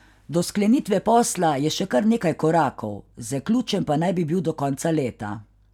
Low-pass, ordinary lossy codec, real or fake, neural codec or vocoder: 19.8 kHz; none; real; none